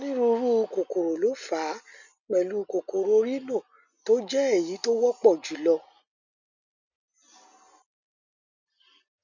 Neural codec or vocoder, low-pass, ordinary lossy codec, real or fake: none; 7.2 kHz; none; real